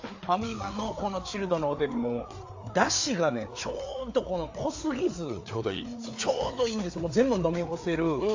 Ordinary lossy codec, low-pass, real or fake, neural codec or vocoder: AAC, 48 kbps; 7.2 kHz; fake; codec, 16 kHz, 4 kbps, FreqCodec, larger model